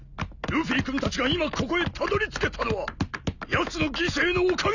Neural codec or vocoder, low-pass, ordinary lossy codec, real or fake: none; 7.2 kHz; none; real